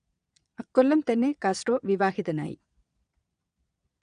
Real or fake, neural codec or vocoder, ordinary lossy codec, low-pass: fake; vocoder, 22.05 kHz, 80 mel bands, Vocos; none; 9.9 kHz